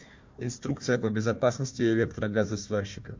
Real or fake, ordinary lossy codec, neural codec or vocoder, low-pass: fake; MP3, 48 kbps; codec, 16 kHz, 1 kbps, FunCodec, trained on Chinese and English, 50 frames a second; 7.2 kHz